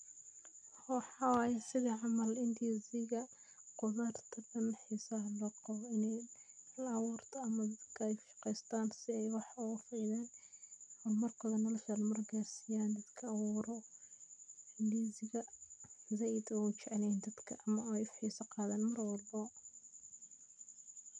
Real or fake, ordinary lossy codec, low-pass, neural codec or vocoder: real; none; 9.9 kHz; none